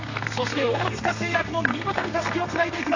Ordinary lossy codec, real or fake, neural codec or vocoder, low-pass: AAC, 48 kbps; fake; codec, 32 kHz, 1.9 kbps, SNAC; 7.2 kHz